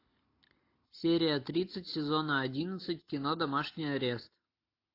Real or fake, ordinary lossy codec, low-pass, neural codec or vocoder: real; AAC, 32 kbps; 5.4 kHz; none